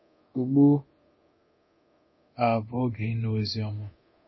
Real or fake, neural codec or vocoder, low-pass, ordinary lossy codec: fake; codec, 24 kHz, 0.9 kbps, DualCodec; 7.2 kHz; MP3, 24 kbps